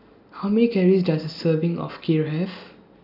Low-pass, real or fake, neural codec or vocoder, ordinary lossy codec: 5.4 kHz; real; none; none